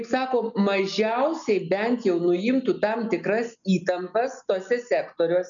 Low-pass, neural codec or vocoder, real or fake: 7.2 kHz; none; real